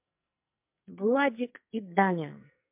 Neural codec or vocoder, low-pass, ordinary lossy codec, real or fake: codec, 44.1 kHz, 2.6 kbps, SNAC; 3.6 kHz; MP3, 32 kbps; fake